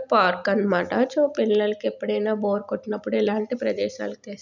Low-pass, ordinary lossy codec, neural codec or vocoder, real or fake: 7.2 kHz; none; none; real